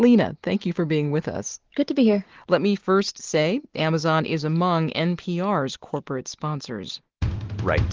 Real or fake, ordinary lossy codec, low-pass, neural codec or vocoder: real; Opus, 16 kbps; 7.2 kHz; none